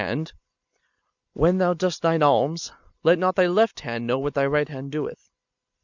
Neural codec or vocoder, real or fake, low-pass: none; real; 7.2 kHz